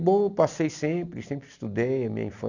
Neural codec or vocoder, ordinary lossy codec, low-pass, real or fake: none; none; 7.2 kHz; real